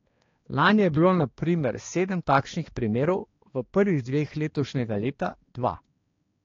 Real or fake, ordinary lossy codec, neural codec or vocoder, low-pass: fake; AAC, 32 kbps; codec, 16 kHz, 2 kbps, X-Codec, HuBERT features, trained on balanced general audio; 7.2 kHz